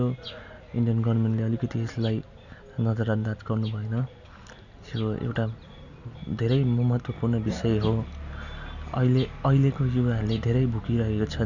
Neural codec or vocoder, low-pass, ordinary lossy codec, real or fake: none; 7.2 kHz; none; real